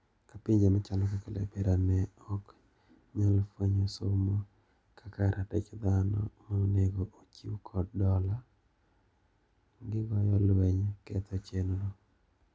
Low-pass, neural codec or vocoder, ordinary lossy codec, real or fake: none; none; none; real